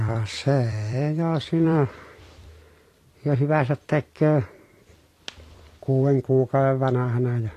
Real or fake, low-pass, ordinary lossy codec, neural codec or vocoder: fake; 14.4 kHz; AAC, 48 kbps; vocoder, 44.1 kHz, 128 mel bands every 256 samples, BigVGAN v2